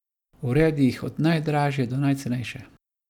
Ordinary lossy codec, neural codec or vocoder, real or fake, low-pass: none; none; real; 19.8 kHz